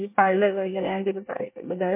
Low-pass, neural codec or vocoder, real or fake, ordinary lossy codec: 3.6 kHz; codec, 24 kHz, 1 kbps, SNAC; fake; MP3, 24 kbps